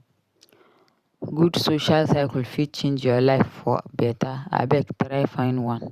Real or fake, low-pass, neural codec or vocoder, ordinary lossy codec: fake; 14.4 kHz; vocoder, 44.1 kHz, 128 mel bands every 512 samples, BigVGAN v2; Opus, 64 kbps